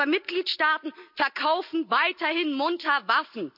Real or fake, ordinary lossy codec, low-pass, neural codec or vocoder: real; none; 5.4 kHz; none